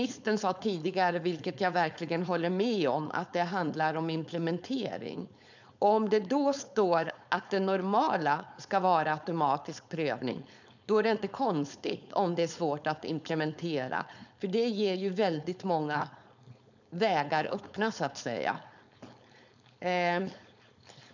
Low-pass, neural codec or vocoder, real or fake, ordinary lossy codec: 7.2 kHz; codec, 16 kHz, 4.8 kbps, FACodec; fake; none